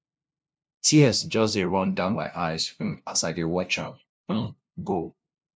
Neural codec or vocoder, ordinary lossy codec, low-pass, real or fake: codec, 16 kHz, 0.5 kbps, FunCodec, trained on LibriTTS, 25 frames a second; none; none; fake